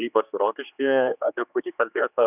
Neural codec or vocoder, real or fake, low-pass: codec, 16 kHz, 2 kbps, X-Codec, HuBERT features, trained on general audio; fake; 3.6 kHz